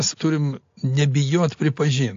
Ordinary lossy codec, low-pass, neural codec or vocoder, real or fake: AAC, 48 kbps; 7.2 kHz; none; real